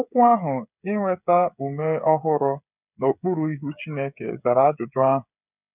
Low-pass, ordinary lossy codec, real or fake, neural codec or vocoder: 3.6 kHz; none; fake; codec, 16 kHz, 8 kbps, FreqCodec, smaller model